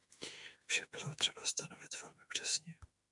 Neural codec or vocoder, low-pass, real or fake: autoencoder, 48 kHz, 32 numbers a frame, DAC-VAE, trained on Japanese speech; 10.8 kHz; fake